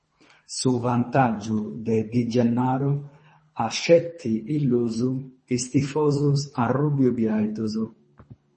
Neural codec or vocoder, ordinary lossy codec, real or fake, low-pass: codec, 24 kHz, 3 kbps, HILCodec; MP3, 32 kbps; fake; 10.8 kHz